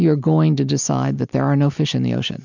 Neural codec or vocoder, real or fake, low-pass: none; real; 7.2 kHz